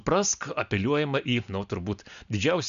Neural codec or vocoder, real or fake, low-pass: none; real; 7.2 kHz